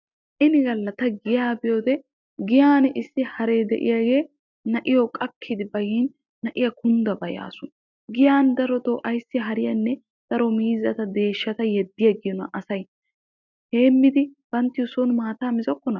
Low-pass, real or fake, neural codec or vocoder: 7.2 kHz; real; none